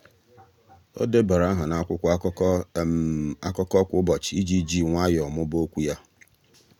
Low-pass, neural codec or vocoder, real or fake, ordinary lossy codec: 19.8 kHz; none; real; none